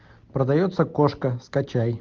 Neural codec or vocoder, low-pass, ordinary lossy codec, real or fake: none; 7.2 kHz; Opus, 32 kbps; real